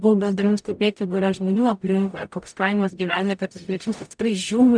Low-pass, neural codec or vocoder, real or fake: 9.9 kHz; codec, 44.1 kHz, 0.9 kbps, DAC; fake